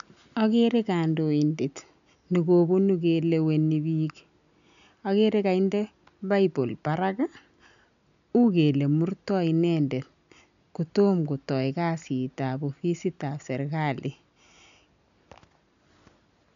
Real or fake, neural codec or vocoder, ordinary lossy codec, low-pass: real; none; none; 7.2 kHz